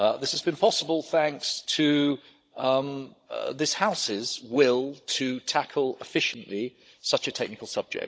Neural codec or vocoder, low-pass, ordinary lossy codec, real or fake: codec, 16 kHz, 16 kbps, FunCodec, trained on Chinese and English, 50 frames a second; none; none; fake